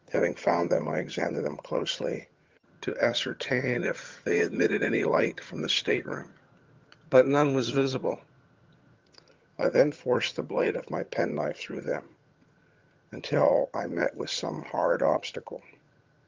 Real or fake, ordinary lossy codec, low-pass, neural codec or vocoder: fake; Opus, 32 kbps; 7.2 kHz; vocoder, 22.05 kHz, 80 mel bands, HiFi-GAN